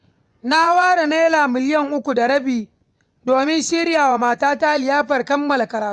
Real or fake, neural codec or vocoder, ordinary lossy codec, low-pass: fake; vocoder, 24 kHz, 100 mel bands, Vocos; none; 10.8 kHz